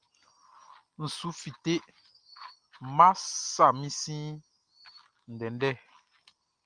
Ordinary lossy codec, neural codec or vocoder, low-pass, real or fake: Opus, 32 kbps; none; 9.9 kHz; real